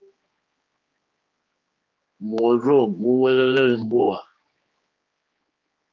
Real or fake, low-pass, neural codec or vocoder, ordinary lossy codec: fake; 7.2 kHz; codec, 16 kHz, 2 kbps, X-Codec, HuBERT features, trained on general audio; Opus, 32 kbps